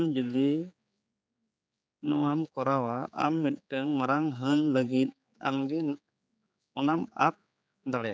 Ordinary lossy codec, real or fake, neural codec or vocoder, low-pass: none; fake; codec, 16 kHz, 4 kbps, X-Codec, HuBERT features, trained on general audio; none